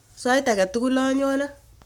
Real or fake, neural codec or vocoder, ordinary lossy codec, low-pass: fake; codec, 44.1 kHz, 7.8 kbps, Pupu-Codec; none; 19.8 kHz